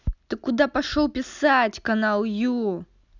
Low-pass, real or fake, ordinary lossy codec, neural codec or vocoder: 7.2 kHz; real; none; none